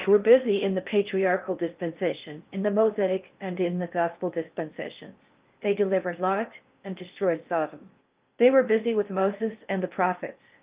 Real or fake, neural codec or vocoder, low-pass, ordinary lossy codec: fake; codec, 16 kHz in and 24 kHz out, 0.6 kbps, FocalCodec, streaming, 4096 codes; 3.6 kHz; Opus, 24 kbps